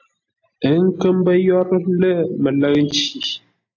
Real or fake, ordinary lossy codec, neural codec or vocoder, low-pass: real; AAC, 48 kbps; none; 7.2 kHz